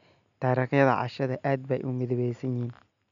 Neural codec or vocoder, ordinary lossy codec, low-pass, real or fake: none; none; 7.2 kHz; real